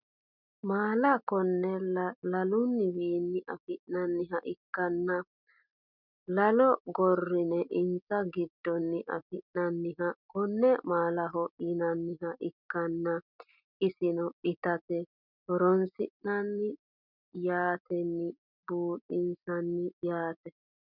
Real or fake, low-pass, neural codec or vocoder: real; 5.4 kHz; none